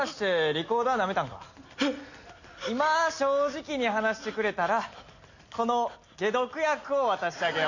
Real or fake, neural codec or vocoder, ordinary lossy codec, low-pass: real; none; none; 7.2 kHz